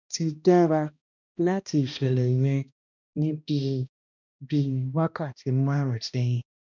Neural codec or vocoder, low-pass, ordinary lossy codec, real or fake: codec, 16 kHz, 1 kbps, X-Codec, HuBERT features, trained on balanced general audio; 7.2 kHz; none; fake